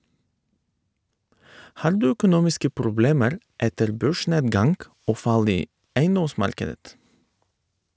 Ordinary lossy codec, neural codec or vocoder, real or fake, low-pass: none; none; real; none